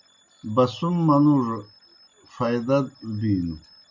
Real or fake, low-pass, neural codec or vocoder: real; 7.2 kHz; none